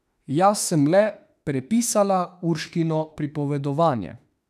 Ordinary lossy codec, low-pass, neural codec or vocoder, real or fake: none; 14.4 kHz; autoencoder, 48 kHz, 32 numbers a frame, DAC-VAE, trained on Japanese speech; fake